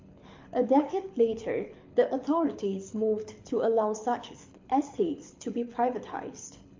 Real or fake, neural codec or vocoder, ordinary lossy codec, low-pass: fake; codec, 24 kHz, 6 kbps, HILCodec; MP3, 48 kbps; 7.2 kHz